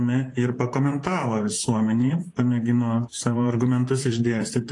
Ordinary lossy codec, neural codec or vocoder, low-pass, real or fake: AAC, 48 kbps; vocoder, 44.1 kHz, 128 mel bands, Pupu-Vocoder; 10.8 kHz; fake